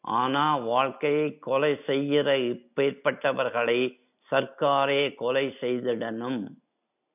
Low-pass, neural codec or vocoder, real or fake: 3.6 kHz; none; real